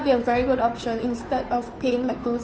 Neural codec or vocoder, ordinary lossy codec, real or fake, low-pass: codec, 16 kHz, 2 kbps, FunCodec, trained on Chinese and English, 25 frames a second; none; fake; none